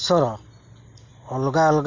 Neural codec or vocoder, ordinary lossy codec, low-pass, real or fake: none; Opus, 64 kbps; 7.2 kHz; real